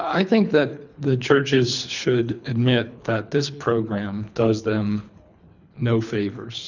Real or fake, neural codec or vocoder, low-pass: fake; codec, 24 kHz, 3 kbps, HILCodec; 7.2 kHz